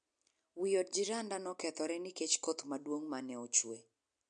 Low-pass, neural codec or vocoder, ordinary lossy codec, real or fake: 9.9 kHz; none; MP3, 64 kbps; real